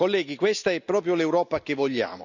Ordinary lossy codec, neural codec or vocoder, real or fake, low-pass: none; none; real; 7.2 kHz